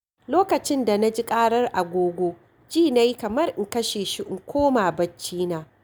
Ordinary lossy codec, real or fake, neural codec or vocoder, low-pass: none; real; none; none